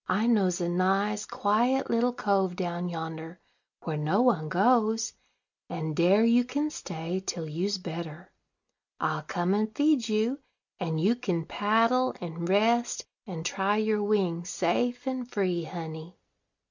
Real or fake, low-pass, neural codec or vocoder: real; 7.2 kHz; none